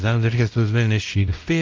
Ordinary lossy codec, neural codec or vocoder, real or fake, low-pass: Opus, 24 kbps; codec, 16 kHz, 0.5 kbps, X-Codec, WavLM features, trained on Multilingual LibriSpeech; fake; 7.2 kHz